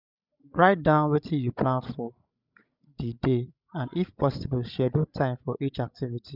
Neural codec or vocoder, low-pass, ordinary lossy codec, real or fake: codec, 16 kHz, 16 kbps, FreqCodec, larger model; 5.4 kHz; none; fake